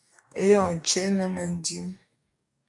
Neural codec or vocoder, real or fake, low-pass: codec, 44.1 kHz, 2.6 kbps, DAC; fake; 10.8 kHz